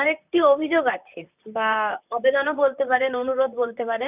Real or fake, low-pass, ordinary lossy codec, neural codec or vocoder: fake; 3.6 kHz; none; vocoder, 44.1 kHz, 128 mel bands, Pupu-Vocoder